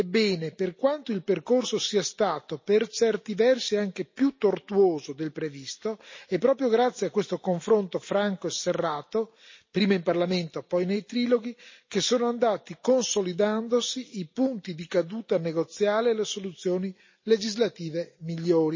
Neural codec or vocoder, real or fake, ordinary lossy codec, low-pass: none; real; MP3, 32 kbps; 7.2 kHz